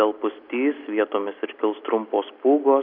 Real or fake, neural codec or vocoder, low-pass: real; none; 5.4 kHz